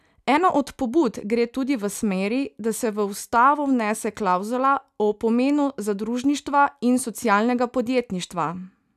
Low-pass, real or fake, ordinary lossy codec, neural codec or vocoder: 14.4 kHz; real; none; none